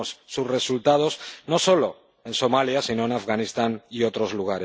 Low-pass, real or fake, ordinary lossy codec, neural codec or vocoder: none; real; none; none